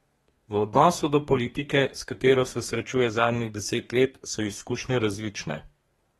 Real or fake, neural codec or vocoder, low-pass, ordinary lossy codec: fake; codec, 32 kHz, 1.9 kbps, SNAC; 14.4 kHz; AAC, 32 kbps